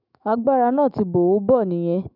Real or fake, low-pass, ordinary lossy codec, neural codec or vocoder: fake; 5.4 kHz; none; autoencoder, 48 kHz, 128 numbers a frame, DAC-VAE, trained on Japanese speech